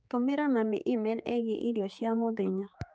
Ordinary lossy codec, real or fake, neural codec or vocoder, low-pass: none; fake; codec, 16 kHz, 4 kbps, X-Codec, HuBERT features, trained on general audio; none